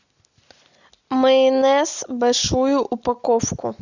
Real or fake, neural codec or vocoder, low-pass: real; none; 7.2 kHz